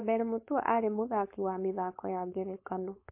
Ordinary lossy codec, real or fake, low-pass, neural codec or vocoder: MP3, 24 kbps; fake; 3.6 kHz; codec, 16 kHz, 4.8 kbps, FACodec